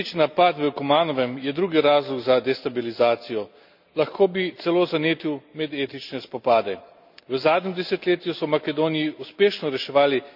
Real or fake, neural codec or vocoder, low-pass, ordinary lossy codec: real; none; 5.4 kHz; none